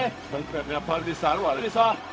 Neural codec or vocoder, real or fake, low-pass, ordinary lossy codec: codec, 16 kHz, 0.4 kbps, LongCat-Audio-Codec; fake; none; none